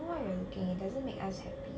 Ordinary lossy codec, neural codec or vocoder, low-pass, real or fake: none; none; none; real